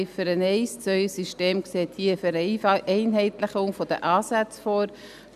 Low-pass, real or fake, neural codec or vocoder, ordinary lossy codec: 14.4 kHz; real; none; none